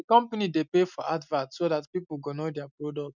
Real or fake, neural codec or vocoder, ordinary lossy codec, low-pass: real; none; none; 7.2 kHz